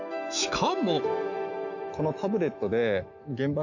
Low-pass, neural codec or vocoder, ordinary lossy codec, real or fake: 7.2 kHz; autoencoder, 48 kHz, 128 numbers a frame, DAC-VAE, trained on Japanese speech; none; fake